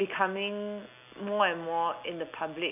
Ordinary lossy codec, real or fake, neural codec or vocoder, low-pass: none; real; none; 3.6 kHz